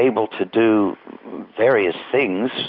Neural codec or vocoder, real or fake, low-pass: none; real; 5.4 kHz